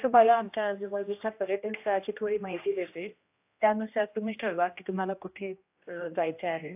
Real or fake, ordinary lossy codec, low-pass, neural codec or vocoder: fake; AAC, 32 kbps; 3.6 kHz; codec, 16 kHz, 1 kbps, X-Codec, HuBERT features, trained on general audio